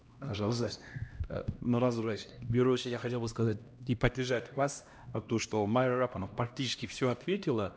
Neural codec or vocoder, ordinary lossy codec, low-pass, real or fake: codec, 16 kHz, 1 kbps, X-Codec, HuBERT features, trained on LibriSpeech; none; none; fake